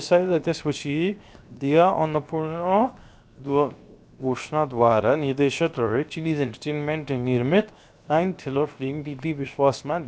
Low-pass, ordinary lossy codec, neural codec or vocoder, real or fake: none; none; codec, 16 kHz, 0.7 kbps, FocalCodec; fake